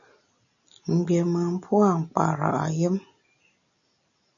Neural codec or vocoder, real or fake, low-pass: none; real; 7.2 kHz